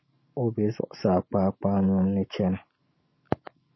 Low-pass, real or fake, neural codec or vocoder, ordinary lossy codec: 7.2 kHz; real; none; MP3, 24 kbps